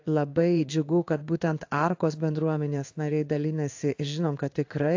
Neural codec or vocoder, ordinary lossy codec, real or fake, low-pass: codec, 16 kHz in and 24 kHz out, 1 kbps, XY-Tokenizer; AAC, 48 kbps; fake; 7.2 kHz